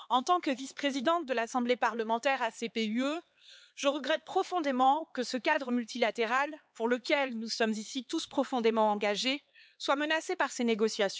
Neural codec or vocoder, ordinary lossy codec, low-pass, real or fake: codec, 16 kHz, 4 kbps, X-Codec, HuBERT features, trained on LibriSpeech; none; none; fake